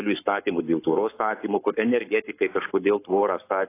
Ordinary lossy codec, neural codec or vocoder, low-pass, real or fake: AAC, 24 kbps; codec, 44.1 kHz, 7.8 kbps, Pupu-Codec; 3.6 kHz; fake